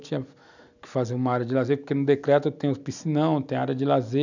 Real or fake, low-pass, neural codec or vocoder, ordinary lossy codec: real; 7.2 kHz; none; none